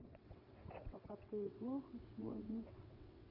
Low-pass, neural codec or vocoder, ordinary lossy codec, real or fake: 5.4 kHz; vocoder, 22.05 kHz, 80 mel bands, WaveNeXt; none; fake